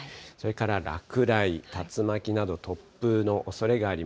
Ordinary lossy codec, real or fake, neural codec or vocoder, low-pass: none; real; none; none